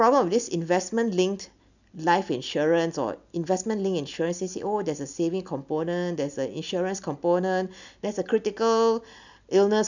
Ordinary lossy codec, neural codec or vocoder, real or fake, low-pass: none; none; real; 7.2 kHz